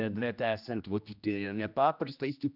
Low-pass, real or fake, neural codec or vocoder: 5.4 kHz; fake; codec, 16 kHz, 1 kbps, X-Codec, HuBERT features, trained on general audio